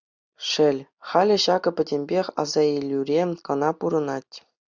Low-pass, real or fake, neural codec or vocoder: 7.2 kHz; real; none